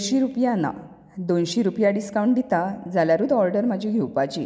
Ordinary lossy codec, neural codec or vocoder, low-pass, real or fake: none; none; none; real